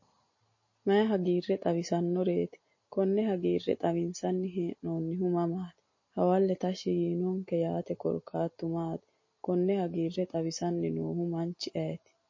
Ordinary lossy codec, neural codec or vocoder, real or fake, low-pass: MP3, 32 kbps; none; real; 7.2 kHz